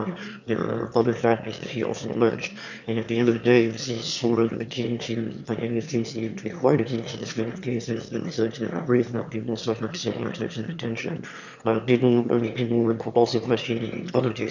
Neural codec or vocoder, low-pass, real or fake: autoencoder, 22.05 kHz, a latent of 192 numbers a frame, VITS, trained on one speaker; 7.2 kHz; fake